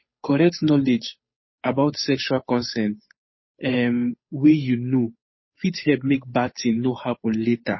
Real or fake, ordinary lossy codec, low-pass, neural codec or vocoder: fake; MP3, 24 kbps; 7.2 kHz; codec, 16 kHz, 8 kbps, FunCodec, trained on Chinese and English, 25 frames a second